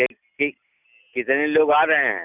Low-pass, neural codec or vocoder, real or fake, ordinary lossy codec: 3.6 kHz; none; real; none